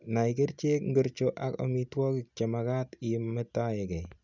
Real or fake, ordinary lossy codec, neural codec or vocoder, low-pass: real; none; none; 7.2 kHz